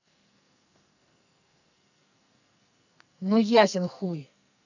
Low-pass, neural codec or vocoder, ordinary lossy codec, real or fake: 7.2 kHz; codec, 44.1 kHz, 2.6 kbps, SNAC; none; fake